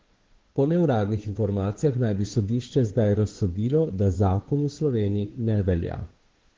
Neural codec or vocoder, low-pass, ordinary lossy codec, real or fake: codec, 16 kHz, 2 kbps, FunCodec, trained on Chinese and English, 25 frames a second; 7.2 kHz; Opus, 16 kbps; fake